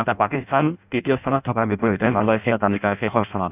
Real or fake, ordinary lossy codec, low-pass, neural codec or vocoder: fake; none; 3.6 kHz; codec, 16 kHz in and 24 kHz out, 0.6 kbps, FireRedTTS-2 codec